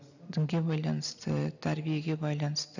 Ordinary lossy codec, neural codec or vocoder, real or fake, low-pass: none; none; real; 7.2 kHz